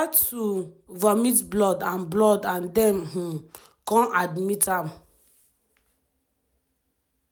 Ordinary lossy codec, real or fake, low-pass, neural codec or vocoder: none; real; none; none